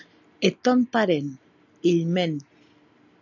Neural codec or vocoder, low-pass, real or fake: none; 7.2 kHz; real